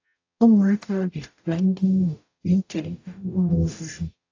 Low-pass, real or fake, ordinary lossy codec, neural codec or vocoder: 7.2 kHz; fake; AAC, 48 kbps; codec, 44.1 kHz, 0.9 kbps, DAC